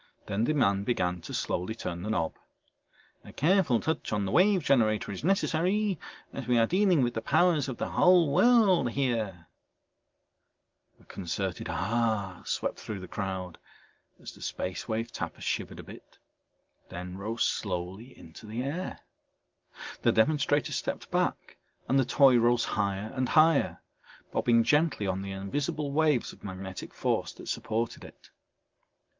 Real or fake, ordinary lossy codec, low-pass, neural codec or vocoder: real; Opus, 32 kbps; 7.2 kHz; none